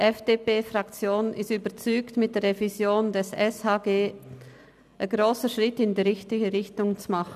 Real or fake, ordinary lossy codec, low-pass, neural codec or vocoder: real; none; 14.4 kHz; none